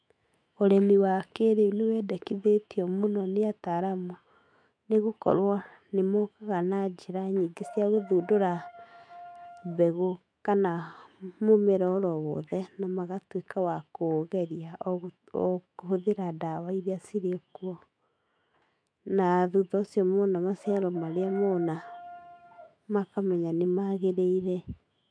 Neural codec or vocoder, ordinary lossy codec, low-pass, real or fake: autoencoder, 48 kHz, 128 numbers a frame, DAC-VAE, trained on Japanese speech; MP3, 64 kbps; 9.9 kHz; fake